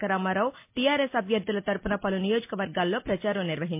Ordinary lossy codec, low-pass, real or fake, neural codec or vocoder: MP3, 24 kbps; 3.6 kHz; real; none